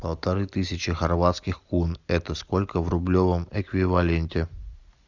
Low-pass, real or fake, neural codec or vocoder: 7.2 kHz; real; none